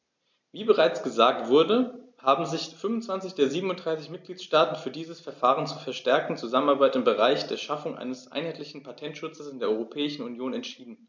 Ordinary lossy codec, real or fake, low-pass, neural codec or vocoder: MP3, 64 kbps; real; 7.2 kHz; none